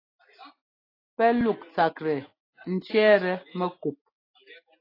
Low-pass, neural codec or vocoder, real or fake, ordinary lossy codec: 5.4 kHz; none; real; AAC, 24 kbps